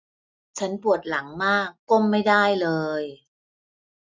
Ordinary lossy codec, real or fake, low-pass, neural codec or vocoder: none; real; none; none